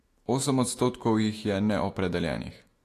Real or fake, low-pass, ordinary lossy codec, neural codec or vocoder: fake; 14.4 kHz; AAC, 48 kbps; autoencoder, 48 kHz, 128 numbers a frame, DAC-VAE, trained on Japanese speech